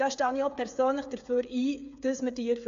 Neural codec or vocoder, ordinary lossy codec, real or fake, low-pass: codec, 16 kHz, 8 kbps, FreqCodec, smaller model; AAC, 64 kbps; fake; 7.2 kHz